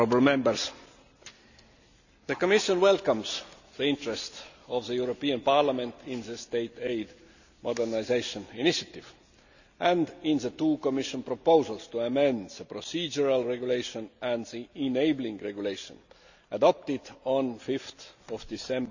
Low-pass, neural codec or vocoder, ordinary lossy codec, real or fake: 7.2 kHz; none; none; real